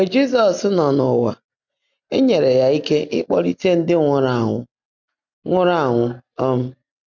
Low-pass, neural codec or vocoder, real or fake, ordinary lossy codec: 7.2 kHz; none; real; none